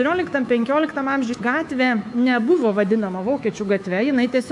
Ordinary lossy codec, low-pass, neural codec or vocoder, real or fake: AAC, 64 kbps; 10.8 kHz; codec, 24 kHz, 3.1 kbps, DualCodec; fake